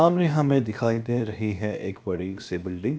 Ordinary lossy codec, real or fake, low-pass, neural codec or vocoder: none; fake; none; codec, 16 kHz, about 1 kbps, DyCAST, with the encoder's durations